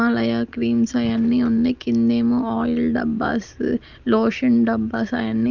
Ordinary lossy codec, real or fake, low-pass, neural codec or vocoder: Opus, 24 kbps; real; 7.2 kHz; none